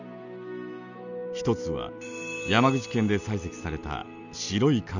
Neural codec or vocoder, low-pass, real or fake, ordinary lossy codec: none; 7.2 kHz; real; none